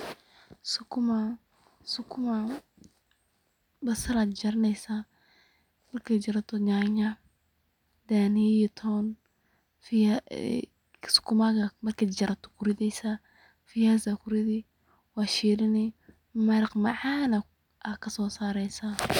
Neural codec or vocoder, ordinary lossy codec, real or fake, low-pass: none; none; real; 19.8 kHz